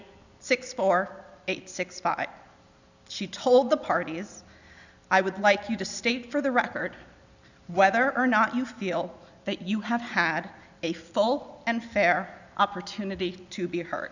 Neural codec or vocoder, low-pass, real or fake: none; 7.2 kHz; real